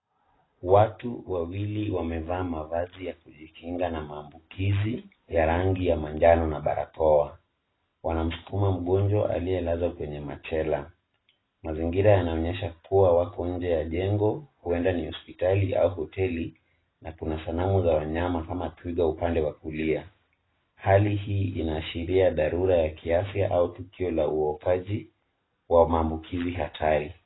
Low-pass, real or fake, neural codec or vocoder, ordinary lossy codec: 7.2 kHz; real; none; AAC, 16 kbps